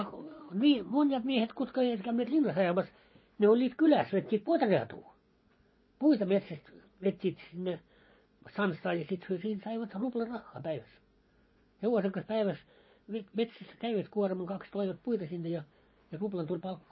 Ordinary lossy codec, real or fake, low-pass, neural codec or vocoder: MP3, 24 kbps; fake; 7.2 kHz; vocoder, 24 kHz, 100 mel bands, Vocos